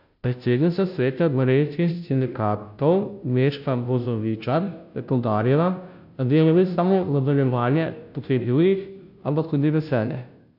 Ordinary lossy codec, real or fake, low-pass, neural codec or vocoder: none; fake; 5.4 kHz; codec, 16 kHz, 0.5 kbps, FunCodec, trained on Chinese and English, 25 frames a second